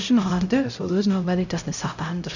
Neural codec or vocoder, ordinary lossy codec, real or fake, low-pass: codec, 16 kHz, 0.5 kbps, X-Codec, HuBERT features, trained on LibriSpeech; none; fake; 7.2 kHz